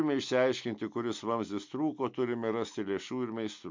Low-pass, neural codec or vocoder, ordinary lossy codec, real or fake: 7.2 kHz; none; MP3, 64 kbps; real